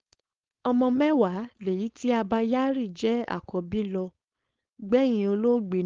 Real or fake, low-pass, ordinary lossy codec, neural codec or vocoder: fake; 7.2 kHz; Opus, 32 kbps; codec, 16 kHz, 4.8 kbps, FACodec